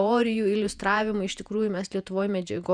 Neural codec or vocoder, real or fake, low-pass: vocoder, 48 kHz, 128 mel bands, Vocos; fake; 9.9 kHz